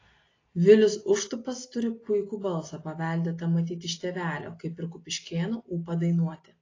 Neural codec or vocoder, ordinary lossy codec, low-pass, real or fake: none; AAC, 32 kbps; 7.2 kHz; real